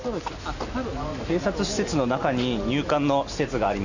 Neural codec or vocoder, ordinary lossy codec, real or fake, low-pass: none; none; real; 7.2 kHz